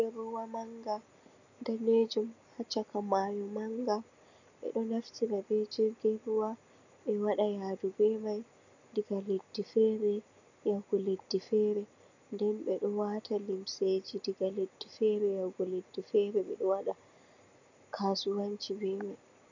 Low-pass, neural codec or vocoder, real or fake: 7.2 kHz; none; real